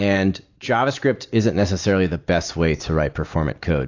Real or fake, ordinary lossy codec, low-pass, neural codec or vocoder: real; AAC, 48 kbps; 7.2 kHz; none